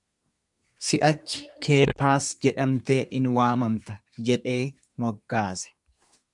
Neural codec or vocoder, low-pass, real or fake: codec, 24 kHz, 1 kbps, SNAC; 10.8 kHz; fake